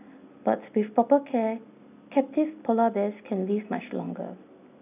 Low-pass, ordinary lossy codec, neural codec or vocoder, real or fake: 3.6 kHz; none; none; real